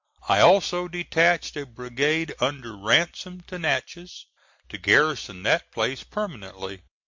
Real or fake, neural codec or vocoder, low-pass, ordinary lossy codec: real; none; 7.2 kHz; MP3, 48 kbps